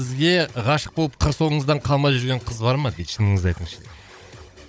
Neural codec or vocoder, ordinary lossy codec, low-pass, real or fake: codec, 16 kHz, 16 kbps, FunCodec, trained on Chinese and English, 50 frames a second; none; none; fake